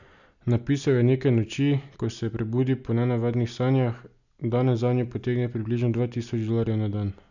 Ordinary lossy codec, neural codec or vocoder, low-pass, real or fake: none; none; 7.2 kHz; real